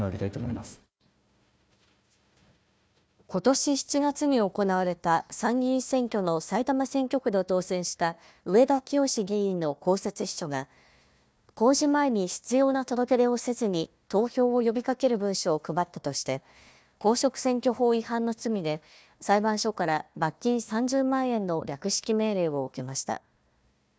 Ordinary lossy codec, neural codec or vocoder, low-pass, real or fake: none; codec, 16 kHz, 1 kbps, FunCodec, trained on Chinese and English, 50 frames a second; none; fake